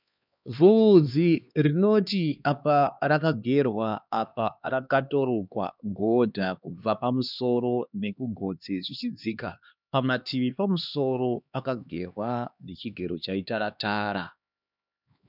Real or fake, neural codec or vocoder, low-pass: fake; codec, 16 kHz, 2 kbps, X-Codec, HuBERT features, trained on LibriSpeech; 5.4 kHz